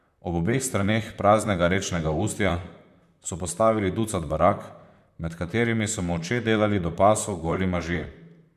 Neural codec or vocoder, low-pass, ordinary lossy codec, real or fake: vocoder, 44.1 kHz, 128 mel bands, Pupu-Vocoder; 14.4 kHz; MP3, 96 kbps; fake